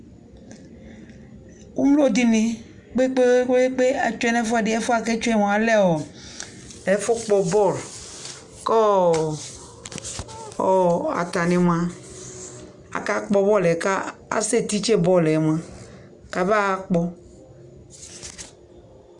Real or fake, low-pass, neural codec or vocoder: real; 10.8 kHz; none